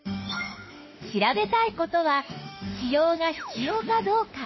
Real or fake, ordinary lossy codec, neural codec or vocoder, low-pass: fake; MP3, 24 kbps; autoencoder, 48 kHz, 32 numbers a frame, DAC-VAE, trained on Japanese speech; 7.2 kHz